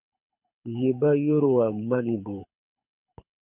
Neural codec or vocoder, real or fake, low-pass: codec, 24 kHz, 6 kbps, HILCodec; fake; 3.6 kHz